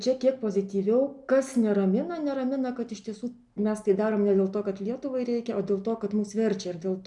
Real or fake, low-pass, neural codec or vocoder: real; 10.8 kHz; none